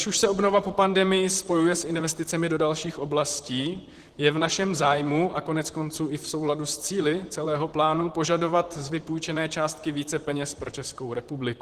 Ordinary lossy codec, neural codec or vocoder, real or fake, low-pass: Opus, 16 kbps; vocoder, 44.1 kHz, 128 mel bands, Pupu-Vocoder; fake; 14.4 kHz